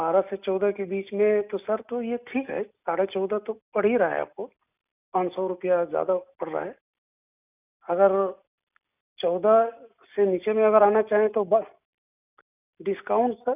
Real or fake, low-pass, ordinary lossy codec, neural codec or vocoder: real; 3.6 kHz; none; none